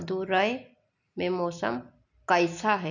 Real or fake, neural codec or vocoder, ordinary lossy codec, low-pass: real; none; none; 7.2 kHz